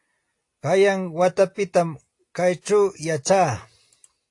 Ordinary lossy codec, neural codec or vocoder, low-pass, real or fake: AAC, 48 kbps; none; 10.8 kHz; real